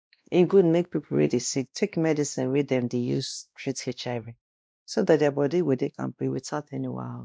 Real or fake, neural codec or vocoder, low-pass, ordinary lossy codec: fake; codec, 16 kHz, 1 kbps, X-Codec, WavLM features, trained on Multilingual LibriSpeech; none; none